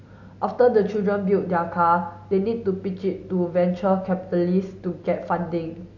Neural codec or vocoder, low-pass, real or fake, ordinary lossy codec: none; 7.2 kHz; real; AAC, 48 kbps